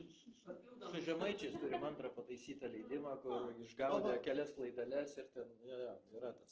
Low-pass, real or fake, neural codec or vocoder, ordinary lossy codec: 7.2 kHz; real; none; Opus, 16 kbps